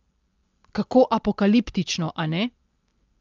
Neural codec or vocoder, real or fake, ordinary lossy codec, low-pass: none; real; Opus, 32 kbps; 7.2 kHz